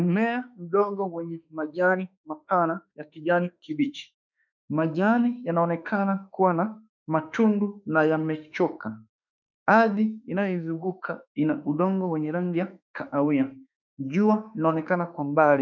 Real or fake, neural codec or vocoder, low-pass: fake; autoencoder, 48 kHz, 32 numbers a frame, DAC-VAE, trained on Japanese speech; 7.2 kHz